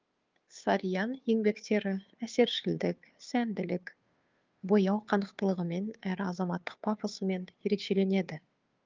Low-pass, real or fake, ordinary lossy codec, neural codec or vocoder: 7.2 kHz; fake; Opus, 24 kbps; codec, 16 kHz, 2 kbps, FunCodec, trained on Chinese and English, 25 frames a second